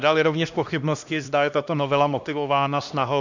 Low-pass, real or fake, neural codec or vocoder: 7.2 kHz; fake; codec, 16 kHz, 1 kbps, X-Codec, HuBERT features, trained on LibriSpeech